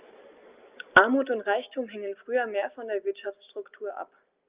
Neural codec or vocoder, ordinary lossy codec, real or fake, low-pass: none; Opus, 32 kbps; real; 3.6 kHz